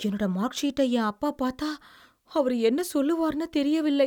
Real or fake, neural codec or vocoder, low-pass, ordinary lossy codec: real; none; 19.8 kHz; none